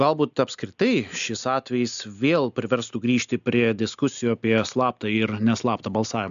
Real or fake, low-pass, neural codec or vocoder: real; 7.2 kHz; none